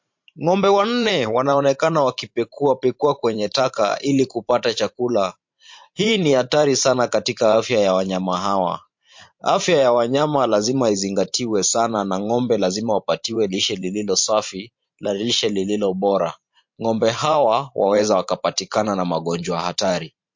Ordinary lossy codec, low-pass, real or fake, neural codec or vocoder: MP3, 48 kbps; 7.2 kHz; fake; vocoder, 44.1 kHz, 128 mel bands every 512 samples, BigVGAN v2